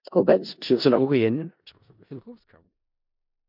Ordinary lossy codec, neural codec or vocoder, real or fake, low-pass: MP3, 48 kbps; codec, 16 kHz in and 24 kHz out, 0.4 kbps, LongCat-Audio-Codec, four codebook decoder; fake; 5.4 kHz